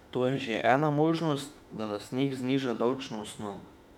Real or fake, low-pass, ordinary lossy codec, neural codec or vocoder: fake; 19.8 kHz; none; autoencoder, 48 kHz, 32 numbers a frame, DAC-VAE, trained on Japanese speech